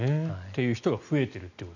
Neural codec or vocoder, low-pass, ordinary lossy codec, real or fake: none; 7.2 kHz; none; real